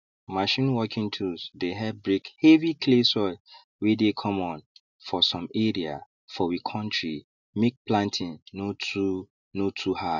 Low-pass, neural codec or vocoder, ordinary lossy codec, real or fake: 7.2 kHz; none; none; real